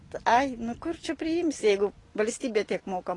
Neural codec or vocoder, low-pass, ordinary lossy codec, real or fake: none; 10.8 kHz; AAC, 32 kbps; real